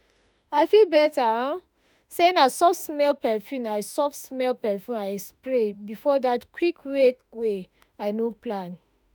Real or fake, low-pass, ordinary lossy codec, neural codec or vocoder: fake; none; none; autoencoder, 48 kHz, 32 numbers a frame, DAC-VAE, trained on Japanese speech